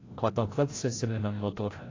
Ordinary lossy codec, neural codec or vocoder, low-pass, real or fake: AAC, 32 kbps; codec, 16 kHz, 0.5 kbps, FreqCodec, larger model; 7.2 kHz; fake